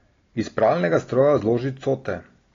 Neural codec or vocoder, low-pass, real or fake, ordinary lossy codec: none; 7.2 kHz; real; AAC, 32 kbps